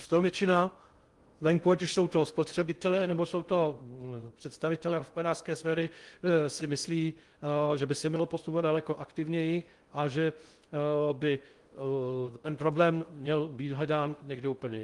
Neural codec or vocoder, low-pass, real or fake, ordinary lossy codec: codec, 16 kHz in and 24 kHz out, 0.6 kbps, FocalCodec, streaming, 2048 codes; 10.8 kHz; fake; Opus, 24 kbps